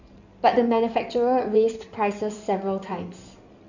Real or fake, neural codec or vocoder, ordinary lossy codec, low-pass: fake; codec, 16 kHz in and 24 kHz out, 2.2 kbps, FireRedTTS-2 codec; none; 7.2 kHz